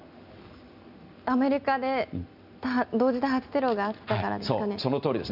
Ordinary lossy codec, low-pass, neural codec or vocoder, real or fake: none; 5.4 kHz; none; real